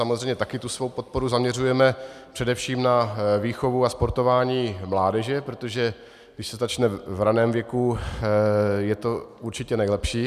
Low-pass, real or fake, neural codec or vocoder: 14.4 kHz; real; none